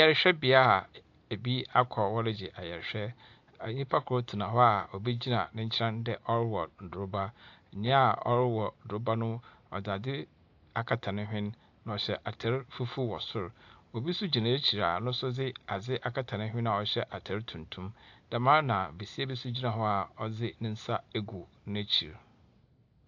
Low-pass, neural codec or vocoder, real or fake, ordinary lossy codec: 7.2 kHz; none; real; AAC, 48 kbps